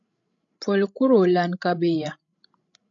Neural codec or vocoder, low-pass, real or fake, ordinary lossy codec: codec, 16 kHz, 16 kbps, FreqCodec, larger model; 7.2 kHz; fake; AAC, 48 kbps